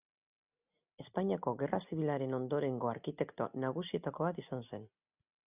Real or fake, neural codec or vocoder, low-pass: real; none; 3.6 kHz